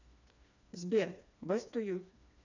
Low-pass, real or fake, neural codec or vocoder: 7.2 kHz; fake; codec, 16 kHz, 2 kbps, FreqCodec, smaller model